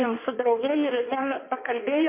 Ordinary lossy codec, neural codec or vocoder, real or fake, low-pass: MP3, 32 kbps; codec, 16 kHz in and 24 kHz out, 1.1 kbps, FireRedTTS-2 codec; fake; 3.6 kHz